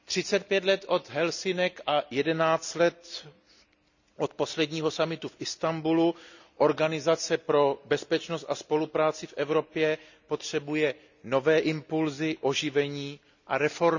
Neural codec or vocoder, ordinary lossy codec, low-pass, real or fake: none; none; 7.2 kHz; real